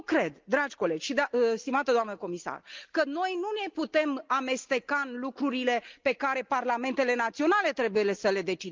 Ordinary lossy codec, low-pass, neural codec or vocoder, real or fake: Opus, 32 kbps; 7.2 kHz; none; real